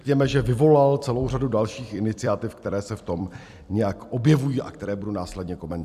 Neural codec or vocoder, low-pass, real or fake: vocoder, 44.1 kHz, 128 mel bands every 256 samples, BigVGAN v2; 14.4 kHz; fake